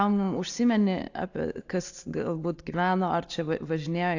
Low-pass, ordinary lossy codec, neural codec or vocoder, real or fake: 7.2 kHz; AAC, 48 kbps; none; real